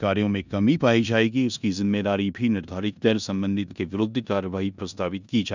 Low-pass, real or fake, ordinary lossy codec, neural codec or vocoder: 7.2 kHz; fake; none; codec, 16 kHz in and 24 kHz out, 0.9 kbps, LongCat-Audio-Codec, four codebook decoder